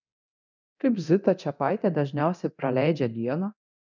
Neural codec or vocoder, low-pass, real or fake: codec, 24 kHz, 0.9 kbps, DualCodec; 7.2 kHz; fake